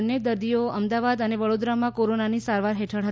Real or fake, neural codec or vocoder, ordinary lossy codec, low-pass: real; none; none; none